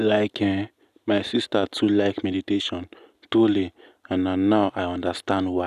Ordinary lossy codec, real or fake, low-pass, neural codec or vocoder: none; real; 14.4 kHz; none